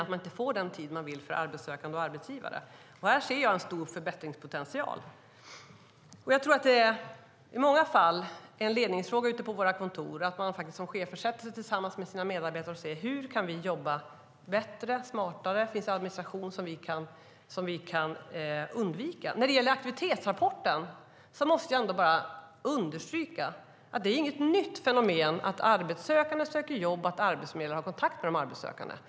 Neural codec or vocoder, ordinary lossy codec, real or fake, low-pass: none; none; real; none